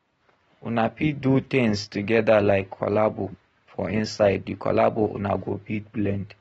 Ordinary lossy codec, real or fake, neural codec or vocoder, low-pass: AAC, 24 kbps; real; none; 19.8 kHz